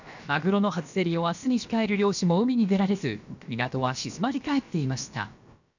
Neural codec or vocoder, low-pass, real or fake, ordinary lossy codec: codec, 16 kHz, about 1 kbps, DyCAST, with the encoder's durations; 7.2 kHz; fake; none